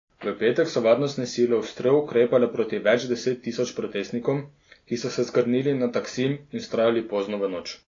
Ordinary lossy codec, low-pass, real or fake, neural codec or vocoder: AAC, 32 kbps; 7.2 kHz; real; none